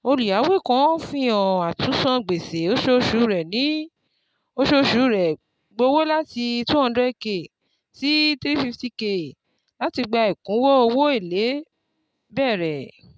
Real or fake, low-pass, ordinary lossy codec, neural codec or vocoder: real; none; none; none